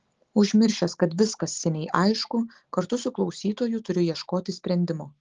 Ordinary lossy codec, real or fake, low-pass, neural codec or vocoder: Opus, 16 kbps; real; 7.2 kHz; none